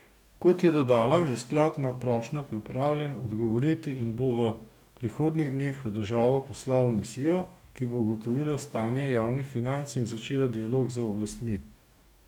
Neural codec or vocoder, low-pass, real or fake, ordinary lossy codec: codec, 44.1 kHz, 2.6 kbps, DAC; 19.8 kHz; fake; none